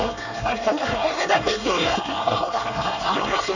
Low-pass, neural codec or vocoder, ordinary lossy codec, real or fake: 7.2 kHz; codec, 24 kHz, 1 kbps, SNAC; none; fake